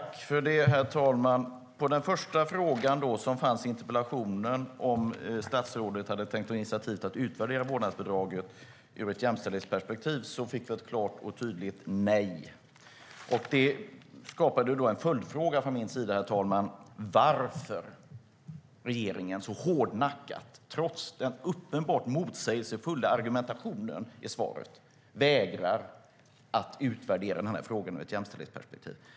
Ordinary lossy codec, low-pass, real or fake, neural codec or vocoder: none; none; real; none